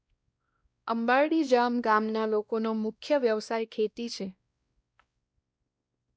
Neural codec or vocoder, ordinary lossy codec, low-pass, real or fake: codec, 16 kHz, 1 kbps, X-Codec, WavLM features, trained on Multilingual LibriSpeech; none; none; fake